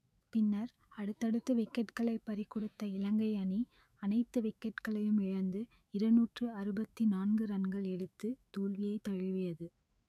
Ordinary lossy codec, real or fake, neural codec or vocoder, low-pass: none; fake; autoencoder, 48 kHz, 128 numbers a frame, DAC-VAE, trained on Japanese speech; 14.4 kHz